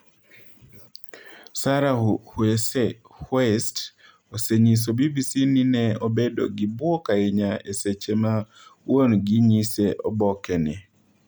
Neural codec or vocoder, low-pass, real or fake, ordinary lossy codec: none; none; real; none